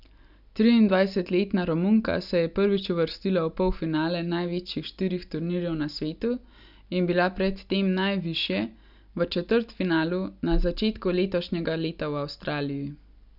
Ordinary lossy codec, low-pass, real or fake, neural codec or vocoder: none; 5.4 kHz; real; none